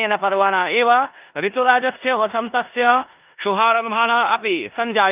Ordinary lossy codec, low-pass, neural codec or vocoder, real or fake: Opus, 24 kbps; 3.6 kHz; codec, 16 kHz in and 24 kHz out, 0.9 kbps, LongCat-Audio-Codec, four codebook decoder; fake